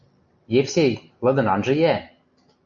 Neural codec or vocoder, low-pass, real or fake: none; 7.2 kHz; real